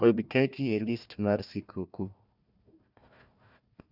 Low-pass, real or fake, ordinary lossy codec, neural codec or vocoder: 5.4 kHz; fake; none; codec, 16 kHz, 1 kbps, FunCodec, trained on Chinese and English, 50 frames a second